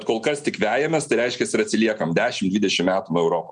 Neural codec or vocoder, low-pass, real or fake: none; 9.9 kHz; real